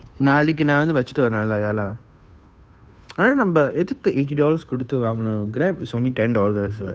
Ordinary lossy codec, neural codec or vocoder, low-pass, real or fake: none; codec, 16 kHz, 2 kbps, FunCodec, trained on Chinese and English, 25 frames a second; none; fake